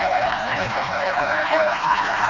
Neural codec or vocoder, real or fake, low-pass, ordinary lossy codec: codec, 16 kHz, 1 kbps, FreqCodec, smaller model; fake; 7.2 kHz; none